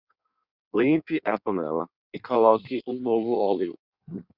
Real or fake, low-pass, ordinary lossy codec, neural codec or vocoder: fake; 5.4 kHz; Opus, 64 kbps; codec, 16 kHz in and 24 kHz out, 1.1 kbps, FireRedTTS-2 codec